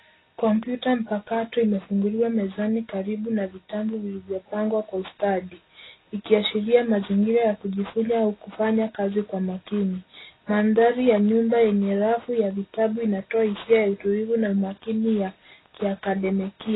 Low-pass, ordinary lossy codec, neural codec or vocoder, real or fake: 7.2 kHz; AAC, 16 kbps; none; real